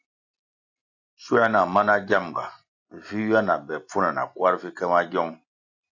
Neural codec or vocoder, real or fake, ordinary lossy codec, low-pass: none; real; AAC, 48 kbps; 7.2 kHz